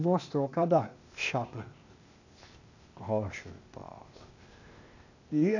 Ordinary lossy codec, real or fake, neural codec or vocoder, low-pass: none; fake; codec, 16 kHz, 0.8 kbps, ZipCodec; 7.2 kHz